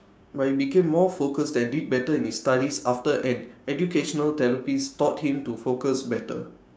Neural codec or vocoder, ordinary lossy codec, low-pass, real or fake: codec, 16 kHz, 6 kbps, DAC; none; none; fake